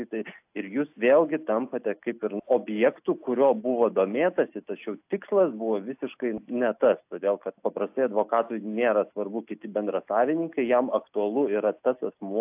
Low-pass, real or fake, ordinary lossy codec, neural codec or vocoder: 3.6 kHz; real; AAC, 32 kbps; none